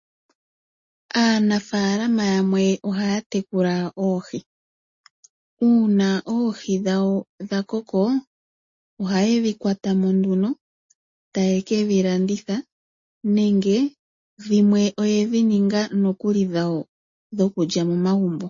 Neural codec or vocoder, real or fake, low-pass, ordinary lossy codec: none; real; 7.2 kHz; MP3, 32 kbps